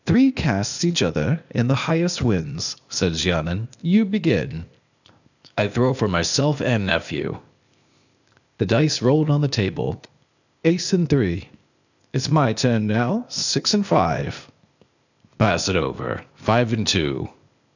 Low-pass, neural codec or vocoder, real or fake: 7.2 kHz; codec, 16 kHz, 0.8 kbps, ZipCodec; fake